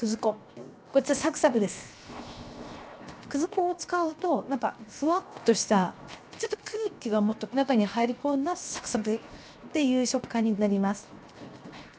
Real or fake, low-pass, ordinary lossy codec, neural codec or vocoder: fake; none; none; codec, 16 kHz, 0.7 kbps, FocalCodec